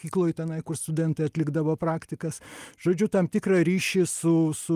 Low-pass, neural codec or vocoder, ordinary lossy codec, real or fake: 14.4 kHz; none; Opus, 32 kbps; real